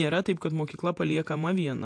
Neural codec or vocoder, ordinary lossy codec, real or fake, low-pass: vocoder, 48 kHz, 128 mel bands, Vocos; AAC, 64 kbps; fake; 9.9 kHz